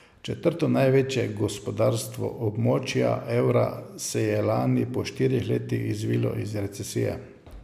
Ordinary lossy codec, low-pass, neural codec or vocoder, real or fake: MP3, 96 kbps; 14.4 kHz; none; real